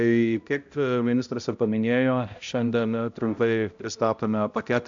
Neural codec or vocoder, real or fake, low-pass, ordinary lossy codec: codec, 16 kHz, 0.5 kbps, X-Codec, HuBERT features, trained on balanced general audio; fake; 7.2 kHz; AAC, 64 kbps